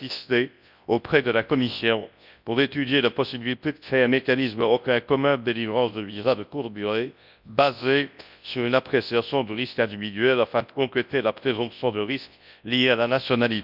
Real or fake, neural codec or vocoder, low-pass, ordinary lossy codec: fake; codec, 24 kHz, 0.9 kbps, WavTokenizer, large speech release; 5.4 kHz; none